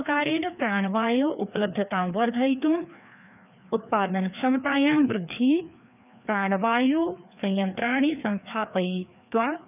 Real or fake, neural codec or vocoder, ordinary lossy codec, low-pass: fake; codec, 16 kHz, 2 kbps, FreqCodec, larger model; none; 3.6 kHz